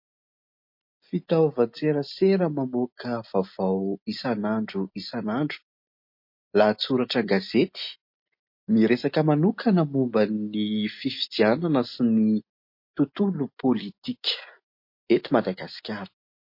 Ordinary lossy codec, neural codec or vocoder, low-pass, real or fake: MP3, 32 kbps; none; 5.4 kHz; real